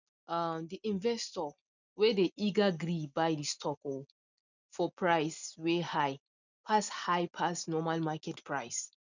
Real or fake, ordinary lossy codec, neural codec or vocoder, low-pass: real; none; none; 7.2 kHz